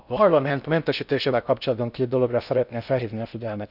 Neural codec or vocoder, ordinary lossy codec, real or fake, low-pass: codec, 16 kHz in and 24 kHz out, 0.6 kbps, FocalCodec, streaming, 4096 codes; none; fake; 5.4 kHz